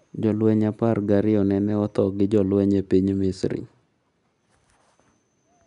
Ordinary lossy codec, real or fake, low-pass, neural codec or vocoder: none; real; 10.8 kHz; none